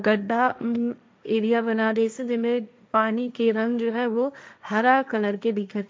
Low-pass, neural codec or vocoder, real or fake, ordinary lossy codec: none; codec, 16 kHz, 1.1 kbps, Voila-Tokenizer; fake; none